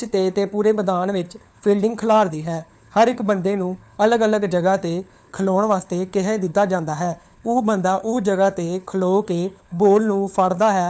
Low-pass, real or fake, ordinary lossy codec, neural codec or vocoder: none; fake; none; codec, 16 kHz, 4 kbps, FunCodec, trained on LibriTTS, 50 frames a second